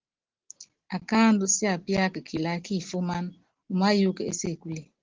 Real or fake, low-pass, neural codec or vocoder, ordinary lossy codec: real; 7.2 kHz; none; Opus, 16 kbps